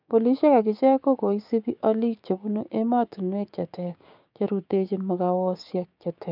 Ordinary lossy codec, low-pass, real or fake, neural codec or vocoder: none; 5.4 kHz; fake; codec, 16 kHz, 6 kbps, DAC